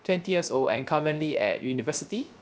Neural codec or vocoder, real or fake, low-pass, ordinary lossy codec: codec, 16 kHz, 0.3 kbps, FocalCodec; fake; none; none